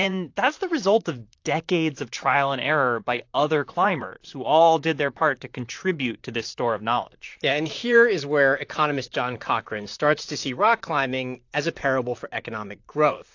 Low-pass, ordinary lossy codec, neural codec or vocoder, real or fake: 7.2 kHz; AAC, 48 kbps; vocoder, 44.1 kHz, 128 mel bands, Pupu-Vocoder; fake